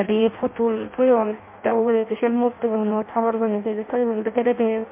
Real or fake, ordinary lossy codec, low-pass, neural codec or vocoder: fake; MP3, 24 kbps; 3.6 kHz; codec, 16 kHz in and 24 kHz out, 0.6 kbps, FireRedTTS-2 codec